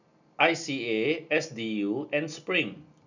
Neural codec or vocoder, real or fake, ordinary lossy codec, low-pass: none; real; none; 7.2 kHz